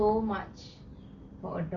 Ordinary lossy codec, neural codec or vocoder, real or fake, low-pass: Opus, 64 kbps; none; real; 7.2 kHz